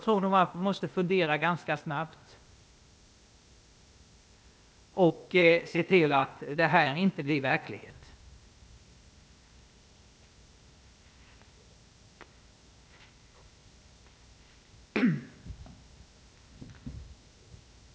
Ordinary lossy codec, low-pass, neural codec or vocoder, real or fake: none; none; codec, 16 kHz, 0.8 kbps, ZipCodec; fake